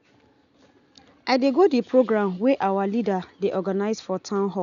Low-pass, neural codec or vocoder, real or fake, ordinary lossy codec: 7.2 kHz; none; real; none